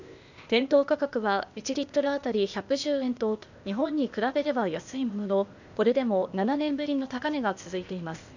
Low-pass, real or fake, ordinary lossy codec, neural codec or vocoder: 7.2 kHz; fake; none; codec, 16 kHz, 0.8 kbps, ZipCodec